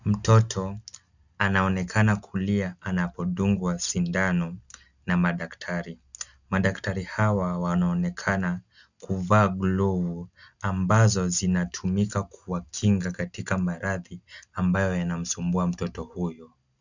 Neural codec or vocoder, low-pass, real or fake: none; 7.2 kHz; real